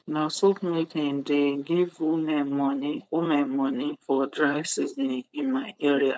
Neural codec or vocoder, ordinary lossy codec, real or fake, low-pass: codec, 16 kHz, 4.8 kbps, FACodec; none; fake; none